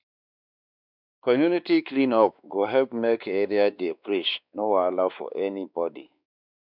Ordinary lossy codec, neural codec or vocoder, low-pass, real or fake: none; codec, 16 kHz, 2 kbps, X-Codec, WavLM features, trained on Multilingual LibriSpeech; 5.4 kHz; fake